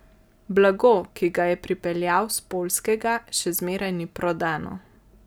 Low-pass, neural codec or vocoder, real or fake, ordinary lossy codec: none; none; real; none